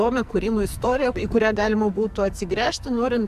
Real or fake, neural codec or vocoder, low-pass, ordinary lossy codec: fake; codec, 44.1 kHz, 2.6 kbps, SNAC; 14.4 kHz; AAC, 96 kbps